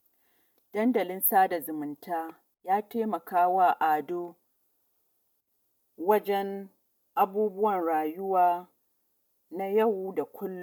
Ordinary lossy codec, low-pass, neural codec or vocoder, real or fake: MP3, 96 kbps; 19.8 kHz; none; real